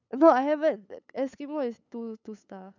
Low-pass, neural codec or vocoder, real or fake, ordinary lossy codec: 7.2 kHz; codec, 16 kHz, 8 kbps, FunCodec, trained on LibriTTS, 25 frames a second; fake; none